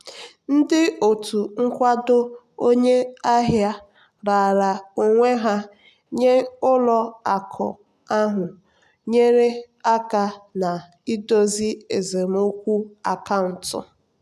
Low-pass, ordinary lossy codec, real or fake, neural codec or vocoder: 14.4 kHz; none; real; none